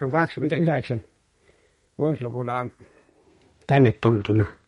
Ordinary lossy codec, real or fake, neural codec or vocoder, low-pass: MP3, 48 kbps; fake; codec, 32 kHz, 1.9 kbps, SNAC; 14.4 kHz